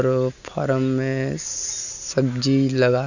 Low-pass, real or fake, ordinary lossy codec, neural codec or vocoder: 7.2 kHz; real; none; none